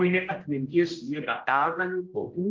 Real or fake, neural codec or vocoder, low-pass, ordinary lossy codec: fake; codec, 16 kHz, 0.5 kbps, X-Codec, HuBERT features, trained on general audio; 7.2 kHz; Opus, 32 kbps